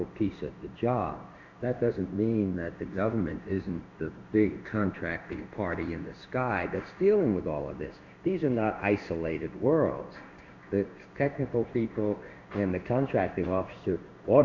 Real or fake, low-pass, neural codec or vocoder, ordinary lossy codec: fake; 7.2 kHz; codec, 16 kHz in and 24 kHz out, 1 kbps, XY-Tokenizer; Opus, 64 kbps